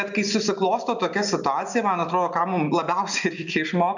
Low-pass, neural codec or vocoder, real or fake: 7.2 kHz; none; real